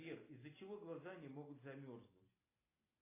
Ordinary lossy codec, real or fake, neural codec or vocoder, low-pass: AAC, 16 kbps; real; none; 3.6 kHz